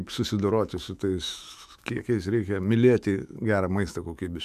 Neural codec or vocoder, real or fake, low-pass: autoencoder, 48 kHz, 128 numbers a frame, DAC-VAE, trained on Japanese speech; fake; 14.4 kHz